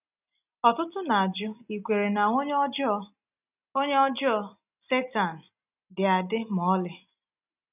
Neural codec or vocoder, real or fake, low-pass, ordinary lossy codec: none; real; 3.6 kHz; none